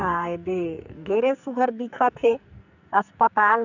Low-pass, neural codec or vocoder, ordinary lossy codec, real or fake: 7.2 kHz; codec, 44.1 kHz, 2.6 kbps, SNAC; none; fake